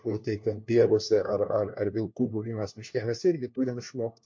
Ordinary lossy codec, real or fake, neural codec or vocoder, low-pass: MP3, 48 kbps; fake; codec, 16 kHz, 1 kbps, FunCodec, trained on LibriTTS, 50 frames a second; 7.2 kHz